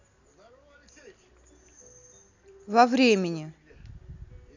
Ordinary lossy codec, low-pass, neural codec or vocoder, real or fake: none; 7.2 kHz; none; real